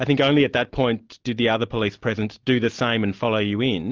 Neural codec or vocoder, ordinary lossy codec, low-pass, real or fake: none; Opus, 24 kbps; 7.2 kHz; real